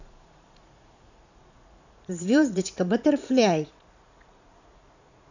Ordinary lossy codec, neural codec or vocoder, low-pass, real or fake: none; vocoder, 44.1 kHz, 80 mel bands, Vocos; 7.2 kHz; fake